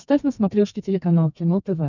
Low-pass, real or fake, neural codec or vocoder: 7.2 kHz; fake; codec, 32 kHz, 1.9 kbps, SNAC